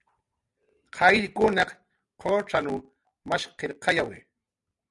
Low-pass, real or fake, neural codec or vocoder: 10.8 kHz; real; none